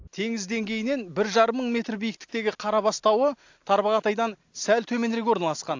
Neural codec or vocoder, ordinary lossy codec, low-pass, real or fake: none; AAC, 48 kbps; 7.2 kHz; real